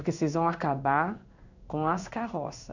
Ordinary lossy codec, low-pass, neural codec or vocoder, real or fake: none; 7.2 kHz; codec, 16 kHz in and 24 kHz out, 1 kbps, XY-Tokenizer; fake